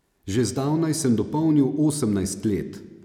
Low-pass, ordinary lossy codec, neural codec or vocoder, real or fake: 19.8 kHz; none; none; real